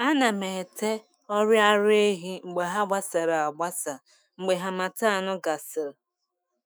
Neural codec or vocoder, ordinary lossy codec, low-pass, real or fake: autoencoder, 48 kHz, 128 numbers a frame, DAC-VAE, trained on Japanese speech; none; none; fake